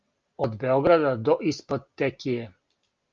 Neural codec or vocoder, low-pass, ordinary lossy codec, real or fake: none; 7.2 kHz; Opus, 24 kbps; real